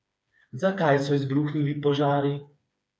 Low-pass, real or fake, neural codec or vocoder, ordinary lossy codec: none; fake; codec, 16 kHz, 4 kbps, FreqCodec, smaller model; none